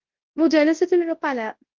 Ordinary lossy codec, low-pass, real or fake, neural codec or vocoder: Opus, 16 kbps; 7.2 kHz; fake; codec, 24 kHz, 0.9 kbps, WavTokenizer, large speech release